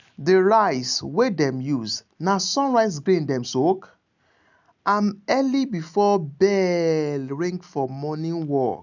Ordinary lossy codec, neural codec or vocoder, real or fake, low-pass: none; none; real; 7.2 kHz